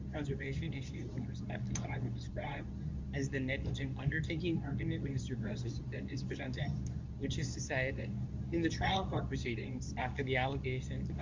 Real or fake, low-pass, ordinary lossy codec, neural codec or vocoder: fake; 7.2 kHz; MP3, 64 kbps; codec, 24 kHz, 0.9 kbps, WavTokenizer, medium speech release version 2